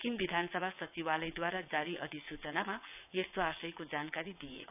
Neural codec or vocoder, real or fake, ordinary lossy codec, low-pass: vocoder, 22.05 kHz, 80 mel bands, WaveNeXt; fake; none; 3.6 kHz